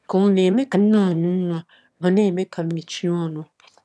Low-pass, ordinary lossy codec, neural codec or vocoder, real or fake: none; none; autoencoder, 22.05 kHz, a latent of 192 numbers a frame, VITS, trained on one speaker; fake